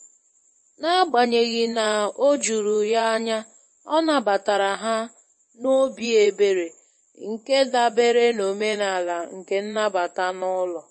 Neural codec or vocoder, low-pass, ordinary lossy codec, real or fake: vocoder, 24 kHz, 100 mel bands, Vocos; 10.8 kHz; MP3, 32 kbps; fake